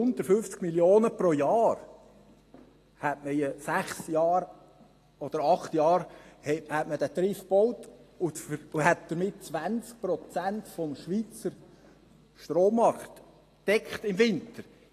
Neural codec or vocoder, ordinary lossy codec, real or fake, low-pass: none; AAC, 48 kbps; real; 14.4 kHz